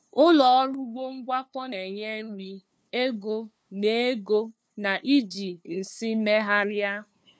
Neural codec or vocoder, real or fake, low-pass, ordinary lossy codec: codec, 16 kHz, 2 kbps, FunCodec, trained on LibriTTS, 25 frames a second; fake; none; none